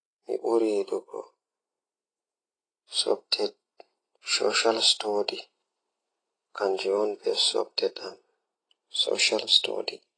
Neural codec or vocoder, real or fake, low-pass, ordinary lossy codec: none; real; 9.9 kHz; AAC, 32 kbps